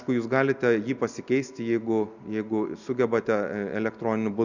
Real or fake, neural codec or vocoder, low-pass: real; none; 7.2 kHz